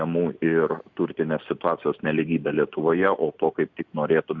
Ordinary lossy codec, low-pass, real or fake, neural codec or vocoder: Opus, 64 kbps; 7.2 kHz; real; none